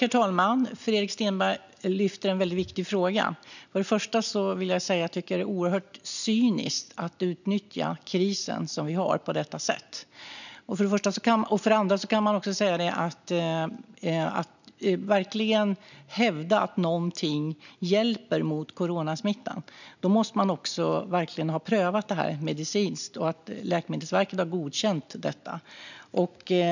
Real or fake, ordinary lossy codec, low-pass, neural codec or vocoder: real; none; 7.2 kHz; none